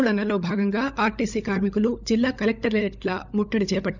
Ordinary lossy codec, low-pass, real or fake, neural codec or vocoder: none; 7.2 kHz; fake; codec, 16 kHz, 16 kbps, FunCodec, trained on LibriTTS, 50 frames a second